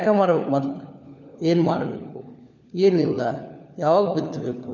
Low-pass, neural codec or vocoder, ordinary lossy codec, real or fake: 7.2 kHz; codec, 16 kHz, 4 kbps, FunCodec, trained on LibriTTS, 50 frames a second; none; fake